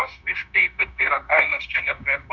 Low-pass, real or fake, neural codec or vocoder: 7.2 kHz; fake; codec, 16 kHz, 0.9 kbps, LongCat-Audio-Codec